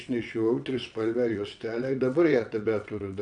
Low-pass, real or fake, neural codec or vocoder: 9.9 kHz; fake; vocoder, 22.05 kHz, 80 mel bands, WaveNeXt